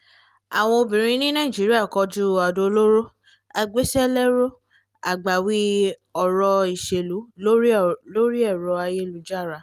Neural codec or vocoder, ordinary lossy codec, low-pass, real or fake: none; Opus, 32 kbps; 14.4 kHz; real